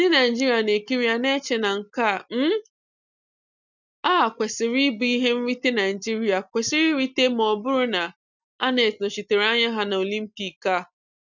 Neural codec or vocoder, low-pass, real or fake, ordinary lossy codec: none; 7.2 kHz; real; none